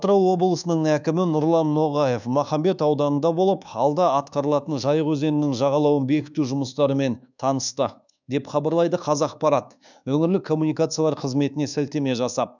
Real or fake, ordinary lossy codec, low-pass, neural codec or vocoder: fake; none; 7.2 kHz; codec, 24 kHz, 1.2 kbps, DualCodec